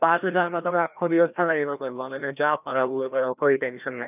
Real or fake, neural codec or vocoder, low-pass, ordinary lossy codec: fake; codec, 16 kHz, 1 kbps, FreqCodec, larger model; 3.6 kHz; none